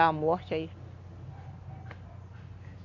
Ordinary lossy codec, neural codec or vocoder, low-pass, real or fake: none; none; 7.2 kHz; real